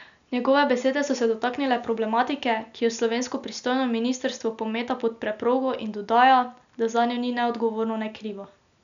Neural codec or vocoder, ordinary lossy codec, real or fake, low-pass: none; none; real; 7.2 kHz